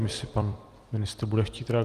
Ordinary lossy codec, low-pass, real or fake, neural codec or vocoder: Opus, 32 kbps; 14.4 kHz; fake; vocoder, 44.1 kHz, 128 mel bands every 256 samples, BigVGAN v2